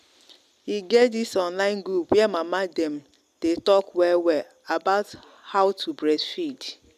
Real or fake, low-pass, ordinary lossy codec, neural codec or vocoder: real; 14.4 kHz; none; none